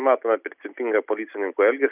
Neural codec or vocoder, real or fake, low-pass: none; real; 3.6 kHz